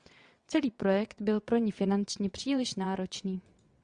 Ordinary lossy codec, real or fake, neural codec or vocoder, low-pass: Opus, 64 kbps; fake; vocoder, 22.05 kHz, 80 mel bands, WaveNeXt; 9.9 kHz